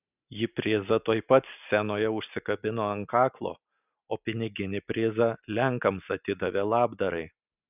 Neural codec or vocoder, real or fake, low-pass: none; real; 3.6 kHz